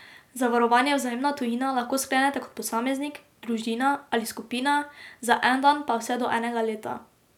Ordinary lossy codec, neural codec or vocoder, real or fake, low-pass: none; none; real; 19.8 kHz